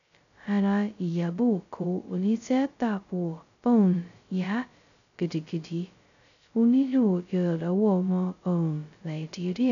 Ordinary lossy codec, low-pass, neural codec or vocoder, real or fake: none; 7.2 kHz; codec, 16 kHz, 0.2 kbps, FocalCodec; fake